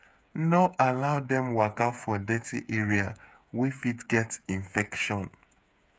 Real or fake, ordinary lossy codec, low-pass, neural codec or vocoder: fake; none; none; codec, 16 kHz, 8 kbps, FreqCodec, smaller model